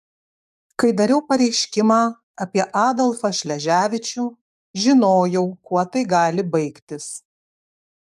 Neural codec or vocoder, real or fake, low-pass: codec, 44.1 kHz, 7.8 kbps, DAC; fake; 14.4 kHz